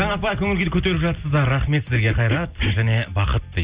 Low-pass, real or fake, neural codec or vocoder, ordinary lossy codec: 3.6 kHz; real; none; Opus, 64 kbps